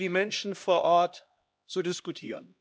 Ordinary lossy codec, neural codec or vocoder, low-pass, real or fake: none; codec, 16 kHz, 1 kbps, X-Codec, HuBERT features, trained on LibriSpeech; none; fake